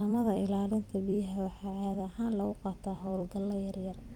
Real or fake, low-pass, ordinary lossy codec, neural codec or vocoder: fake; 19.8 kHz; Opus, 32 kbps; vocoder, 44.1 kHz, 128 mel bands every 256 samples, BigVGAN v2